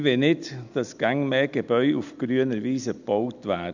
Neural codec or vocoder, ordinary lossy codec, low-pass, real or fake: none; none; 7.2 kHz; real